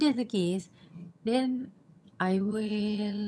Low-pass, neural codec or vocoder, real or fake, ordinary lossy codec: none; vocoder, 22.05 kHz, 80 mel bands, HiFi-GAN; fake; none